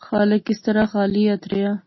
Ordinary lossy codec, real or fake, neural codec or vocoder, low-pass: MP3, 24 kbps; real; none; 7.2 kHz